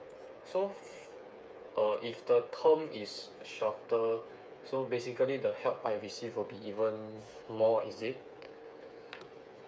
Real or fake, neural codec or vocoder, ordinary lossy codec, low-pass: fake; codec, 16 kHz, 8 kbps, FreqCodec, smaller model; none; none